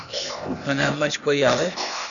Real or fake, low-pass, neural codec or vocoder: fake; 7.2 kHz; codec, 16 kHz, 0.8 kbps, ZipCodec